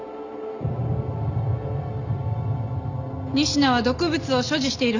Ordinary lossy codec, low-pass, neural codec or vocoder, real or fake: none; 7.2 kHz; none; real